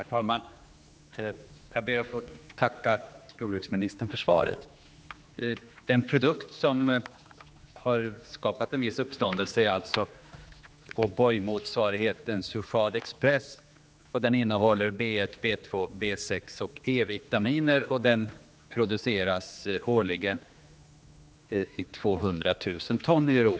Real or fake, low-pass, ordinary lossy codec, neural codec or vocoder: fake; none; none; codec, 16 kHz, 2 kbps, X-Codec, HuBERT features, trained on general audio